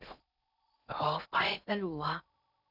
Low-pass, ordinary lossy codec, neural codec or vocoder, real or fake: 5.4 kHz; none; codec, 16 kHz in and 24 kHz out, 0.6 kbps, FocalCodec, streaming, 4096 codes; fake